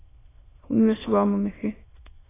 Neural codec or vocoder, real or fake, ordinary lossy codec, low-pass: autoencoder, 22.05 kHz, a latent of 192 numbers a frame, VITS, trained on many speakers; fake; AAC, 16 kbps; 3.6 kHz